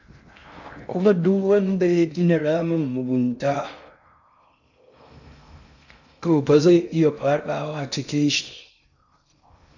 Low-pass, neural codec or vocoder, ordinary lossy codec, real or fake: 7.2 kHz; codec, 16 kHz in and 24 kHz out, 0.6 kbps, FocalCodec, streaming, 2048 codes; none; fake